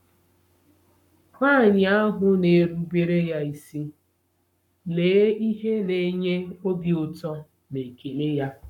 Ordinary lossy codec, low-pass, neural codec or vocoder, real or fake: none; 19.8 kHz; codec, 44.1 kHz, 7.8 kbps, Pupu-Codec; fake